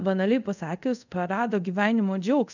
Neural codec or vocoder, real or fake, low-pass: codec, 24 kHz, 0.5 kbps, DualCodec; fake; 7.2 kHz